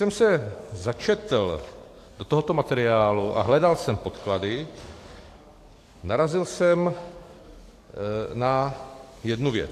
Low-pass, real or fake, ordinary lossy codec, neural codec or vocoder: 14.4 kHz; fake; AAC, 64 kbps; codec, 44.1 kHz, 7.8 kbps, DAC